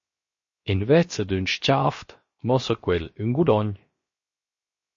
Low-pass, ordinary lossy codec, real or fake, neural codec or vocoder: 7.2 kHz; MP3, 32 kbps; fake; codec, 16 kHz, 0.7 kbps, FocalCodec